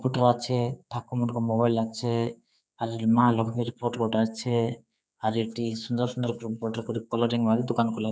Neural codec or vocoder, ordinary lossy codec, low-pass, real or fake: codec, 16 kHz, 4 kbps, X-Codec, HuBERT features, trained on general audio; none; none; fake